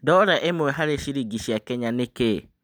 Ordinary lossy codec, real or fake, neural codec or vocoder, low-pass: none; real; none; none